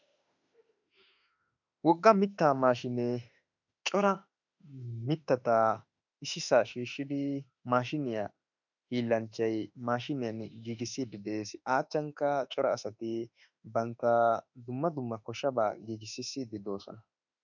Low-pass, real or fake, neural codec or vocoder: 7.2 kHz; fake; autoencoder, 48 kHz, 32 numbers a frame, DAC-VAE, trained on Japanese speech